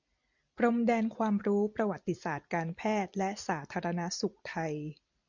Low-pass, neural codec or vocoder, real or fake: 7.2 kHz; vocoder, 44.1 kHz, 128 mel bands every 256 samples, BigVGAN v2; fake